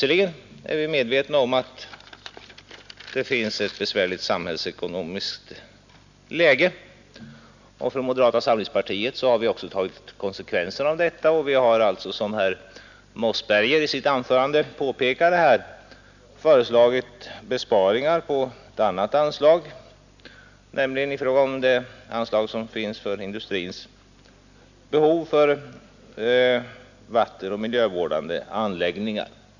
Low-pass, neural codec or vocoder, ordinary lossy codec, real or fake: 7.2 kHz; none; none; real